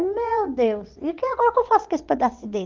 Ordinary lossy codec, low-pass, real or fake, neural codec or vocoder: Opus, 24 kbps; 7.2 kHz; fake; vocoder, 22.05 kHz, 80 mel bands, WaveNeXt